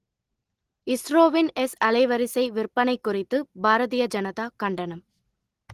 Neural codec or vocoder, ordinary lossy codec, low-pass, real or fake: none; Opus, 16 kbps; 14.4 kHz; real